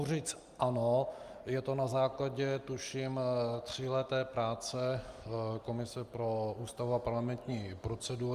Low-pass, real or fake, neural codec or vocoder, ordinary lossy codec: 14.4 kHz; real; none; Opus, 32 kbps